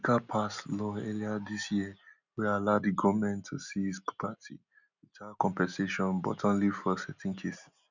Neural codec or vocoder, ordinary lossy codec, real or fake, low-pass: none; none; real; 7.2 kHz